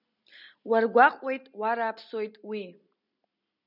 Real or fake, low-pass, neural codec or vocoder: real; 5.4 kHz; none